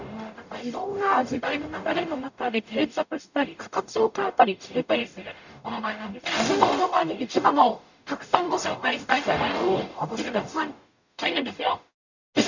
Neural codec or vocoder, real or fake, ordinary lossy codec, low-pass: codec, 44.1 kHz, 0.9 kbps, DAC; fake; none; 7.2 kHz